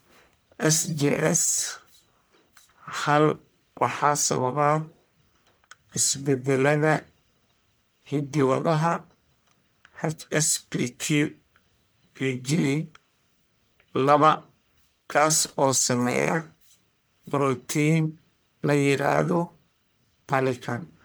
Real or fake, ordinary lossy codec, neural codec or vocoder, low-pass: fake; none; codec, 44.1 kHz, 1.7 kbps, Pupu-Codec; none